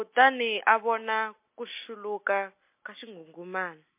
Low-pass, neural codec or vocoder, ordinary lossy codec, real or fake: 3.6 kHz; none; MP3, 24 kbps; real